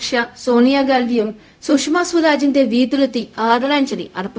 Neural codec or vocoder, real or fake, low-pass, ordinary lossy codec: codec, 16 kHz, 0.4 kbps, LongCat-Audio-Codec; fake; none; none